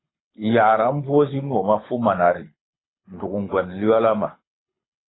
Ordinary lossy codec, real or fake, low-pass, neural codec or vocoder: AAC, 16 kbps; fake; 7.2 kHz; codec, 24 kHz, 6 kbps, HILCodec